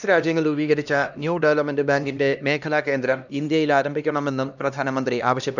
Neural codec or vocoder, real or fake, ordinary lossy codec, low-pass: codec, 16 kHz, 1 kbps, X-Codec, HuBERT features, trained on LibriSpeech; fake; none; 7.2 kHz